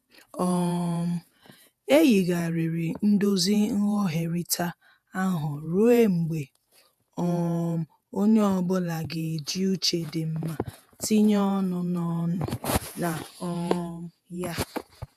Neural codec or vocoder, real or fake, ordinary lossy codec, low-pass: vocoder, 48 kHz, 128 mel bands, Vocos; fake; none; 14.4 kHz